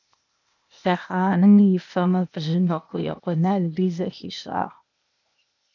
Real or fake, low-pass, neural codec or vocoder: fake; 7.2 kHz; codec, 16 kHz, 0.8 kbps, ZipCodec